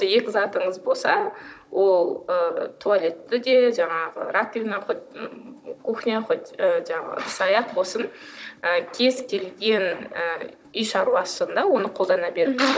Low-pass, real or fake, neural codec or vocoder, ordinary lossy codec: none; fake; codec, 16 kHz, 16 kbps, FunCodec, trained on Chinese and English, 50 frames a second; none